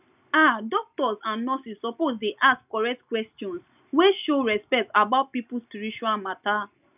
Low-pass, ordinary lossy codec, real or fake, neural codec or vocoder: 3.6 kHz; none; real; none